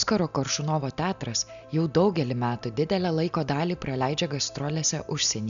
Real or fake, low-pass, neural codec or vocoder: real; 7.2 kHz; none